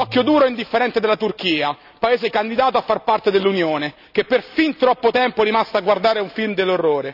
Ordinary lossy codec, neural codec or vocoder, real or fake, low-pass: none; none; real; 5.4 kHz